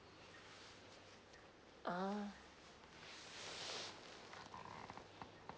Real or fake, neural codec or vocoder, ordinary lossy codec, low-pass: real; none; none; none